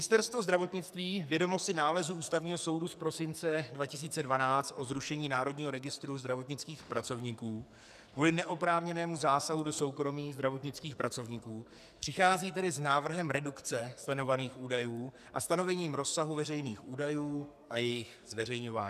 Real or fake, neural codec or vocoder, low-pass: fake; codec, 44.1 kHz, 2.6 kbps, SNAC; 14.4 kHz